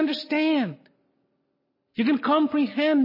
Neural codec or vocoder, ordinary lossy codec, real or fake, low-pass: none; MP3, 24 kbps; real; 5.4 kHz